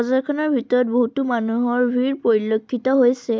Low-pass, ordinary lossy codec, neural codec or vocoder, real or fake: none; none; none; real